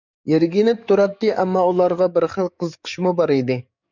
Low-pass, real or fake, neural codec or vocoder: 7.2 kHz; fake; codec, 44.1 kHz, 7.8 kbps, DAC